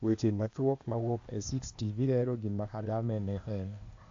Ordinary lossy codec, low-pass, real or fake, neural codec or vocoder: MP3, 48 kbps; 7.2 kHz; fake; codec, 16 kHz, 0.8 kbps, ZipCodec